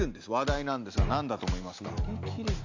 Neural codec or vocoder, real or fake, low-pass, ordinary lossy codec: none; real; 7.2 kHz; AAC, 48 kbps